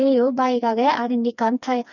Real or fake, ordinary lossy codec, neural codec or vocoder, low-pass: fake; none; codec, 16 kHz, 2 kbps, FreqCodec, smaller model; 7.2 kHz